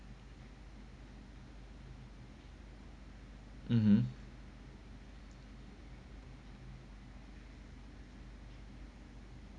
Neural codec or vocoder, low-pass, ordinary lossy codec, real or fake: none; 9.9 kHz; none; real